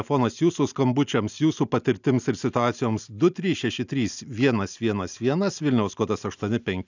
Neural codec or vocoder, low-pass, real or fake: none; 7.2 kHz; real